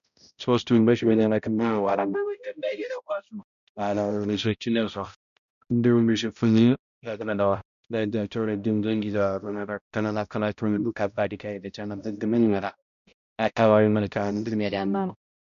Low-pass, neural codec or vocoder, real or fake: 7.2 kHz; codec, 16 kHz, 0.5 kbps, X-Codec, HuBERT features, trained on balanced general audio; fake